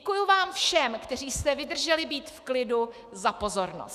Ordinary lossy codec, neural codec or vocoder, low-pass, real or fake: Opus, 64 kbps; autoencoder, 48 kHz, 128 numbers a frame, DAC-VAE, trained on Japanese speech; 14.4 kHz; fake